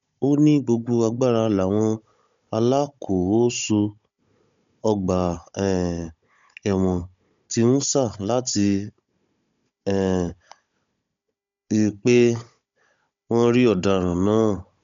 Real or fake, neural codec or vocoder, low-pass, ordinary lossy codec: fake; codec, 16 kHz, 16 kbps, FunCodec, trained on Chinese and English, 50 frames a second; 7.2 kHz; MP3, 64 kbps